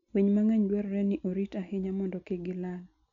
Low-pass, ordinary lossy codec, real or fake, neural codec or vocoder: 7.2 kHz; none; real; none